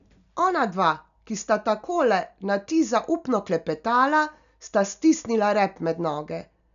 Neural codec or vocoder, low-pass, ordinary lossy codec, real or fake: none; 7.2 kHz; none; real